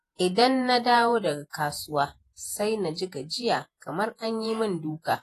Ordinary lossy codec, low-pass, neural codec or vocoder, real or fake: AAC, 48 kbps; 14.4 kHz; vocoder, 48 kHz, 128 mel bands, Vocos; fake